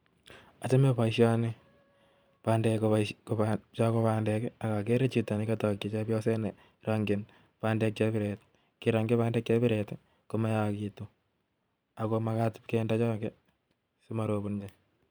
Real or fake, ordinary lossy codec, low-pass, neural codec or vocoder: fake; none; none; vocoder, 44.1 kHz, 128 mel bands every 512 samples, BigVGAN v2